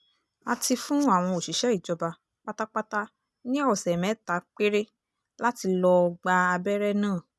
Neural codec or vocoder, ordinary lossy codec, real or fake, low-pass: none; none; real; none